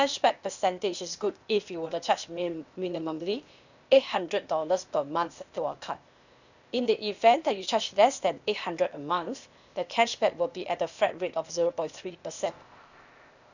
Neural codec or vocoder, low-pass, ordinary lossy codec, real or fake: codec, 16 kHz, 0.8 kbps, ZipCodec; 7.2 kHz; none; fake